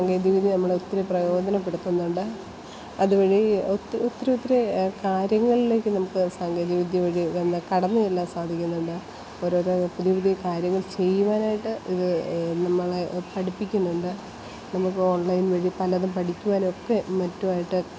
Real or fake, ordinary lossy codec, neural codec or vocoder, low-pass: real; none; none; none